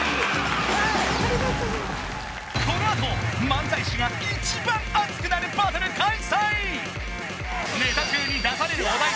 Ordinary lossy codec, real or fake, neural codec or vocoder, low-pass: none; real; none; none